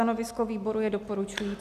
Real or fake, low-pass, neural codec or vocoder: real; 14.4 kHz; none